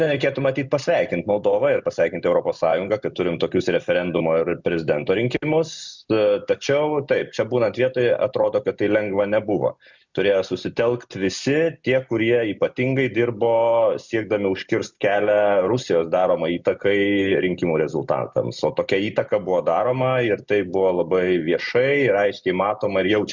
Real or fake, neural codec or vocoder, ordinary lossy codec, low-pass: real; none; Opus, 64 kbps; 7.2 kHz